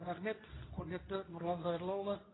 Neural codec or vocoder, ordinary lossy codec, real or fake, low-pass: codec, 24 kHz, 0.9 kbps, WavTokenizer, medium speech release version 2; AAC, 16 kbps; fake; 7.2 kHz